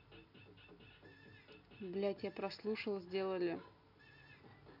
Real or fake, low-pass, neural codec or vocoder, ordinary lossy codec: real; 5.4 kHz; none; none